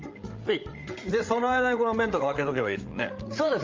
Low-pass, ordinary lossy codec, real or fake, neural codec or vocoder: 7.2 kHz; Opus, 32 kbps; fake; codec, 16 kHz, 16 kbps, FreqCodec, larger model